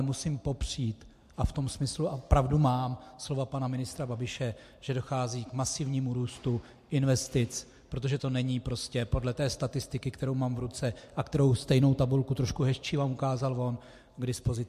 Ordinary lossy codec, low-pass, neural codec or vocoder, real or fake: MP3, 64 kbps; 14.4 kHz; none; real